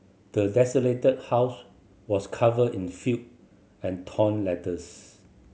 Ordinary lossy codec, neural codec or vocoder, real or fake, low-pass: none; none; real; none